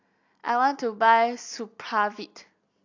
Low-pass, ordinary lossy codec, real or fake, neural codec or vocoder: 7.2 kHz; AAC, 48 kbps; real; none